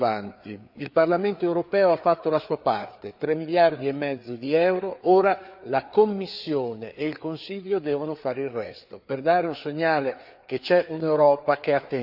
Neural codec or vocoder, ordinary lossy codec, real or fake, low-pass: codec, 16 kHz, 4 kbps, FreqCodec, larger model; none; fake; 5.4 kHz